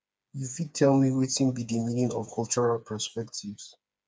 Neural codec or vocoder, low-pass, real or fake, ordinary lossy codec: codec, 16 kHz, 4 kbps, FreqCodec, smaller model; none; fake; none